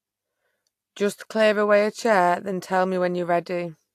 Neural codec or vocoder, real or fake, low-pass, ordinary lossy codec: none; real; 14.4 kHz; AAC, 64 kbps